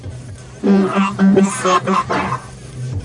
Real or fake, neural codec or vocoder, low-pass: fake; codec, 44.1 kHz, 1.7 kbps, Pupu-Codec; 10.8 kHz